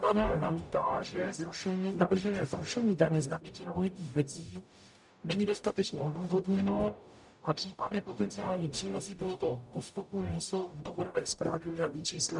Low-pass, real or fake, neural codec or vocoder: 10.8 kHz; fake; codec, 44.1 kHz, 0.9 kbps, DAC